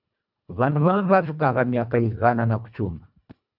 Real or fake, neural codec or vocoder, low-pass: fake; codec, 24 kHz, 1.5 kbps, HILCodec; 5.4 kHz